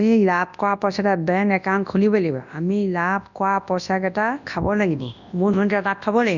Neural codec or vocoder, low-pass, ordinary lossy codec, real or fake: codec, 24 kHz, 0.9 kbps, WavTokenizer, large speech release; 7.2 kHz; none; fake